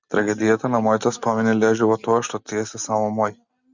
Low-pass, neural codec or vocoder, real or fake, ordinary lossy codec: 7.2 kHz; none; real; Opus, 64 kbps